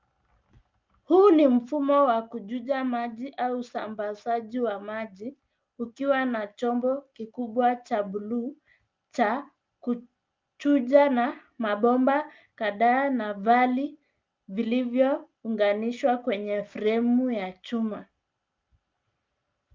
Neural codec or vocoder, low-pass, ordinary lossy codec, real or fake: none; 7.2 kHz; Opus, 24 kbps; real